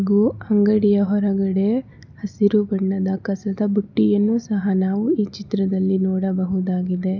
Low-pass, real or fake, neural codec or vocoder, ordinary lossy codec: 7.2 kHz; real; none; none